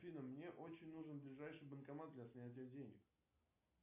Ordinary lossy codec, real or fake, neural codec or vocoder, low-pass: MP3, 24 kbps; real; none; 3.6 kHz